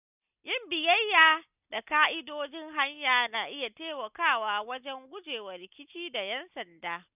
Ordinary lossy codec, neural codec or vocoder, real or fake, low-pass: none; none; real; 3.6 kHz